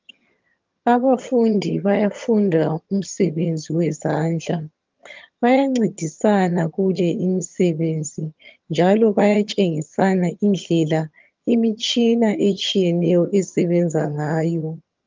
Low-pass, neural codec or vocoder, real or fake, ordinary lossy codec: 7.2 kHz; vocoder, 22.05 kHz, 80 mel bands, HiFi-GAN; fake; Opus, 24 kbps